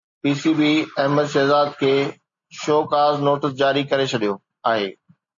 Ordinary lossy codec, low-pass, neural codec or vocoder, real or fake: MP3, 48 kbps; 7.2 kHz; none; real